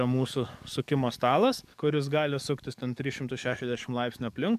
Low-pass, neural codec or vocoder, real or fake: 14.4 kHz; codec, 44.1 kHz, 7.8 kbps, DAC; fake